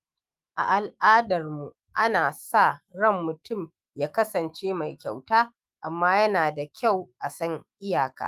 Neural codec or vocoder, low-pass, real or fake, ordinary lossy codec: autoencoder, 48 kHz, 128 numbers a frame, DAC-VAE, trained on Japanese speech; 14.4 kHz; fake; Opus, 32 kbps